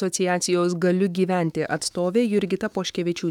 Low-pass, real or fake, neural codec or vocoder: 19.8 kHz; fake; autoencoder, 48 kHz, 128 numbers a frame, DAC-VAE, trained on Japanese speech